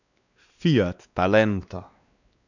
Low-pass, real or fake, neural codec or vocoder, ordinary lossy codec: 7.2 kHz; fake; codec, 16 kHz, 2 kbps, X-Codec, WavLM features, trained on Multilingual LibriSpeech; none